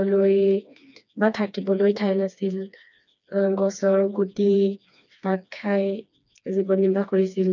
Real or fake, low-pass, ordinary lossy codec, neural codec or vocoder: fake; 7.2 kHz; none; codec, 16 kHz, 2 kbps, FreqCodec, smaller model